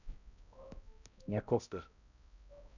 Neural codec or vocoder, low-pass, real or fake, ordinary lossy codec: codec, 16 kHz, 0.5 kbps, X-Codec, HuBERT features, trained on balanced general audio; 7.2 kHz; fake; none